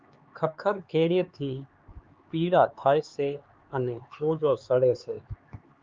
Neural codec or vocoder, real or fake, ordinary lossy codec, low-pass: codec, 16 kHz, 4 kbps, X-Codec, HuBERT features, trained on LibriSpeech; fake; Opus, 24 kbps; 7.2 kHz